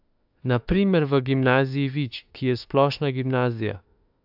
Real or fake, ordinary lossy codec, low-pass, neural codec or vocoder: fake; AAC, 48 kbps; 5.4 kHz; autoencoder, 48 kHz, 32 numbers a frame, DAC-VAE, trained on Japanese speech